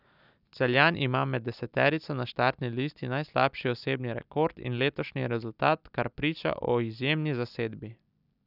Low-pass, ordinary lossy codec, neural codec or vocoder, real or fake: 5.4 kHz; none; none; real